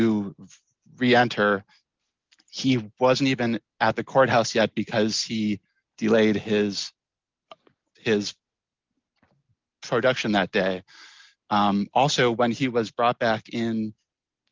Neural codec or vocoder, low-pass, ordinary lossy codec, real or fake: none; 7.2 kHz; Opus, 24 kbps; real